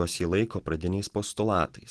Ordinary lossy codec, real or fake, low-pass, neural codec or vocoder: Opus, 16 kbps; real; 10.8 kHz; none